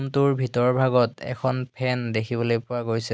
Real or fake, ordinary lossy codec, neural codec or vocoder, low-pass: real; none; none; none